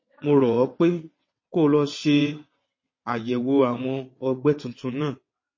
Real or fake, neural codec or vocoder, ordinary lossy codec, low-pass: fake; vocoder, 22.05 kHz, 80 mel bands, WaveNeXt; MP3, 32 kbps; 7.2 kHz